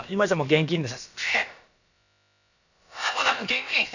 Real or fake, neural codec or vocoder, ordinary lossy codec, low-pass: fake; codec, 16 kHz, about 1 kbps, DyCAST, with the encoder's durations; none; 7.2 kHz